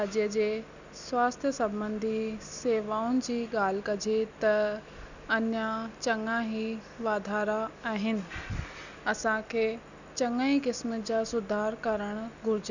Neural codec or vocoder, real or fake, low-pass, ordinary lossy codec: none; real; 7.2 kHz; none